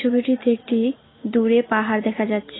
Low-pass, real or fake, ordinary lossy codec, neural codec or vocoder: 7.2 kHz; real; AAC, 16 kbps; none